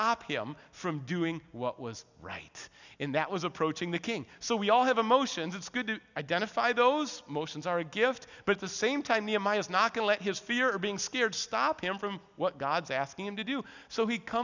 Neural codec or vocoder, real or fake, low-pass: none; real; 7.2 kHz